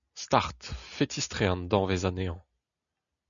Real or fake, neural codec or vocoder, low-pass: real; none; 7.2 kHz